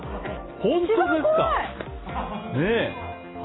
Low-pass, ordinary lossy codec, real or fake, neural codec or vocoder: 7.2 kHz; AAC, 16 kbps; real; none